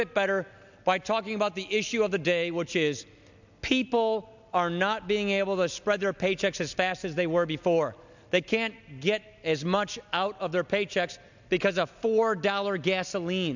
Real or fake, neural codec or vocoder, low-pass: real; none; 7.2 kHz